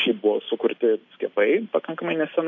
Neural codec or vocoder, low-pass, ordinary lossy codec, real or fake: none; 7.2 kHz; MP3, 48 kbps; real